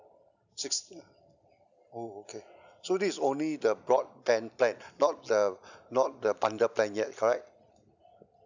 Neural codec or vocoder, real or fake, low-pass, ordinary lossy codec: none; real; 7.2 kHz; none